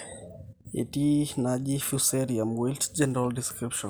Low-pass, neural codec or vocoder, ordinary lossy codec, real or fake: none; none; none; real